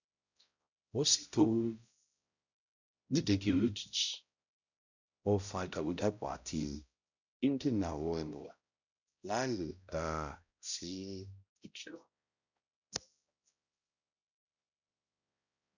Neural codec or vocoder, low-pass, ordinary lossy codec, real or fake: codec, 16 kHz, 0.5 kbps, X-Codec, HuBERT features, trained on balanced general audio; 7.2 kHz; none; fake